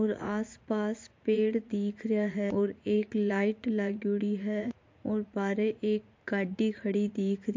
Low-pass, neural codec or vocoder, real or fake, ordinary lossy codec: 7.2 kHz; vocoder, 44.1 kHz, 80 mel bands, Vocos; fake; MP3, 48 kbps